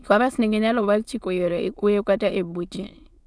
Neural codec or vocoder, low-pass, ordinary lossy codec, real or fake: autoencoder, 22.05 kHz, a latent of 192 numbers a frame, VITS, trained on many speakers; none; none; fake